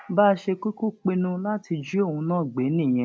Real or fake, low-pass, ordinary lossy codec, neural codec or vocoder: real; none; none; none